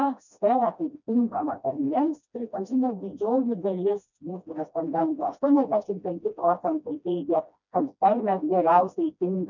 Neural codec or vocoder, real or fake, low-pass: codec, 16 kHz, 1 kbps, FreqCodec, smaller model; fake; 7.2 kHz